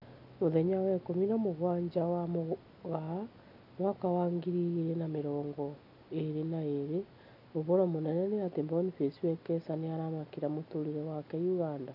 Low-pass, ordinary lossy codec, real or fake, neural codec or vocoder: 5.4 kHz; none; real; none